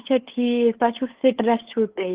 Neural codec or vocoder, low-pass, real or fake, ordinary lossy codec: codec, 16 kHz, 8 kbps, FunCodec, trained on LibriTTS, 25 frames a second; 3.6 kHz; fake; Opus, 16 kbps